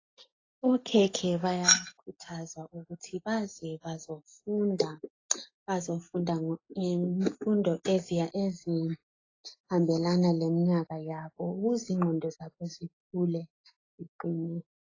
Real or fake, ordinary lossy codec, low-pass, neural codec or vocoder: real; AAC, 32 kbps; 7.2 kHz; none